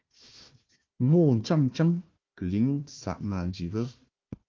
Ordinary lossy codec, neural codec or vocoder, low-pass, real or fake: Opus, 24 kbps; codec, 16 kHz, 1 kbps, FunCodec, trained on Chinese and English, 50 frames a second; 7.2 kHz; fake